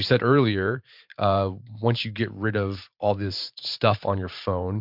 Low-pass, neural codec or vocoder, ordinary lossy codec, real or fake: 5.4 kHz; vocoder, 44.1 kHz, 128 mel bands every 512 samples, BigVGAN v2; MP3, 48 kbps; fake